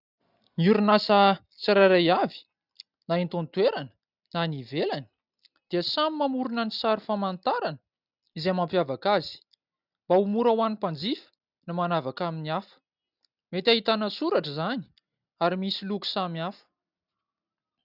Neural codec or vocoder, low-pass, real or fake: none; 5.4 kHz; real